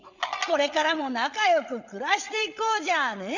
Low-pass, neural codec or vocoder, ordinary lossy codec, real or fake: 7.2 kHz; codec, 16 kHz, 8 kbps, FreqCodec, larger model; none; fake